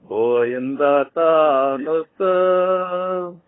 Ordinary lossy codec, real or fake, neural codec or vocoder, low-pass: AAC, 16 kbps; fake; codec, 16 kHz, 2 kbps, FunCodec, trained on LibriTTS, 25 frames a second; 7.2 kHz